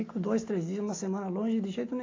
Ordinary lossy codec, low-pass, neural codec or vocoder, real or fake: AAC, 32 kbps; 7.2 kHz; none; real